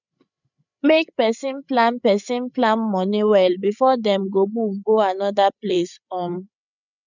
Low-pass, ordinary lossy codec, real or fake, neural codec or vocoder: 7.2 kHz; none; fake; codec, 16 kHz, 8 kbps, FreqCodec, larger model